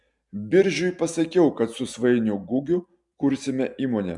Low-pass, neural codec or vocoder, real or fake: 10.8 kHz; none; real